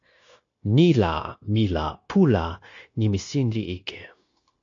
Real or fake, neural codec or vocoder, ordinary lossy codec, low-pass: fake; codec, 16 kHz, 0.9 kbps, LongCat-Audio-Codec; AAC, 48 kbps; 7.2 kHz